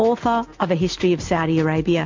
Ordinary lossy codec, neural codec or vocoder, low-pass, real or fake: MP3, 48 kbps; none; 7.2 kHz; real